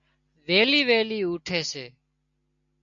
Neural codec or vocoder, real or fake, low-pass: none; real; 7.2 kHz